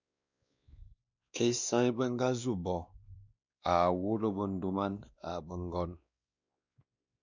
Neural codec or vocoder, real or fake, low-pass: codec, 16 kHz, 2 kbps, X-Codec, WavLM features, trained on Multilingual LibriSpeech; fake; 7.2 kHz